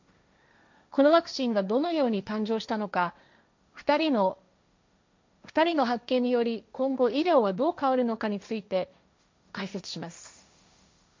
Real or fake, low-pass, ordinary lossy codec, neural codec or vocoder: fake; 7.2 kHz; MP3, 64 kbps; codec, 16 kHz, 1.1 kbps, Voila-Tokenizer